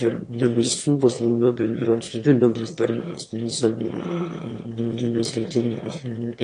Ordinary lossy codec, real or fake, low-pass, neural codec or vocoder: AAC, 48 kbps; fake; 9.9 kHz; autoencoder, 22.05 kHz, a latent of 192 numbers a frame, VITS, trained on one speaker